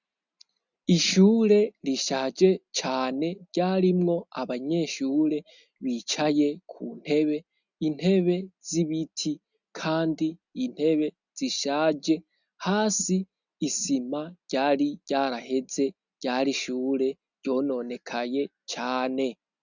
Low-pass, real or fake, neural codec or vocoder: 7.2 kHz; real; none